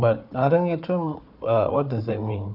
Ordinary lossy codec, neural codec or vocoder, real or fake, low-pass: none; codec, 16 kHz, 4 kbps, FunCodec, trained on Chinese and English, 50 frames a second; fake; 5.4 kHz